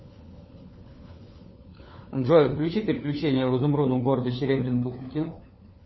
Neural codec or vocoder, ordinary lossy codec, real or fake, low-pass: codec, 16 kHz, 4 kbps, FunCodec, trained on LibriTTS, 50 frames a second; MP3, 24 kbps; fake; 7.2 kHz